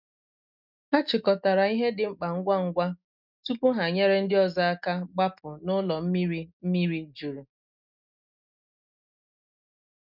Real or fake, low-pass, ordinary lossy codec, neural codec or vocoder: real; 5.4 kHz; none; none